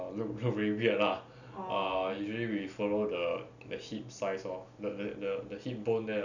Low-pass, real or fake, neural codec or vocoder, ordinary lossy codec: 7.2 kHz; real; none; none